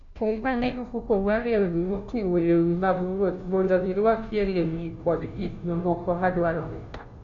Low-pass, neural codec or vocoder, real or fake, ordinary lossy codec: 7.2 kHz; codec, 16 kHz, 0.5 kbps, FunCodec, trained on Chinese and English, 25 frames a second; fake; none